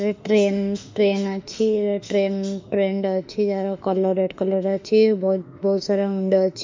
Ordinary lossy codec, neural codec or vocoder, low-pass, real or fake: MP3, 64 kbps; autoencoder, 48 kHz, 32 numbers a frame, DAC-VAE, trained on Japanese speech; 7.2 kHz; fake